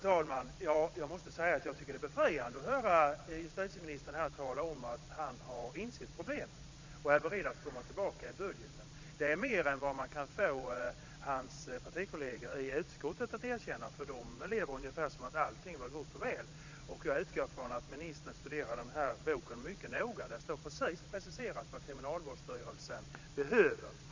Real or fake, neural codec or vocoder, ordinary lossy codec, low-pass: fake; vocoder, 22.05 kHz, 80 mel bands, Vocos; MP3, 64 kbps; 7.2 kHz